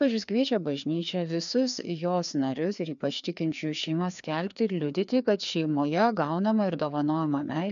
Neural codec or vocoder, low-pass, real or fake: codec, 16 kHz, 2 kbps, FreqCodec, larger model; 7.2 kHz; fake